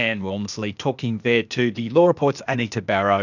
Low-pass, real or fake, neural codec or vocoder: 7.2 kHz; fake; codec, 16 kHz, 0.8 kbps, ZipCodec